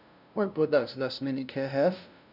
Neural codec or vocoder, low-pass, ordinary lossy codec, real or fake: codec, 16 kHz, 0.5 kbps, FunCodec, trained on LibriTTS, 25 frames a second; 5.4 kHz; none; fake